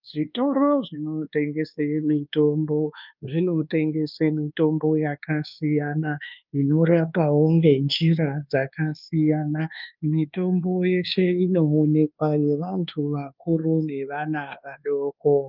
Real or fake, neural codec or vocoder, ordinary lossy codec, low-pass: fake; codec, 24 kHz, 1.2 kbps, DualCodec; Opus, 24 kbps; 5.4 kHz